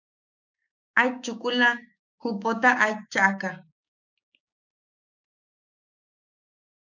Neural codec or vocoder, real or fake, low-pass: codec, 16 kHz, 6 kbps, DAC; fake; 7.2 kHz